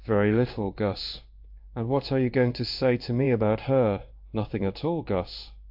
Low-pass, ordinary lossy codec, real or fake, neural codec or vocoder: 5.4 kHz; AAC, 48 kbps; fake; codec, 16 kHz in and 24 kHz out, 1 kbps, XY-Tokenizer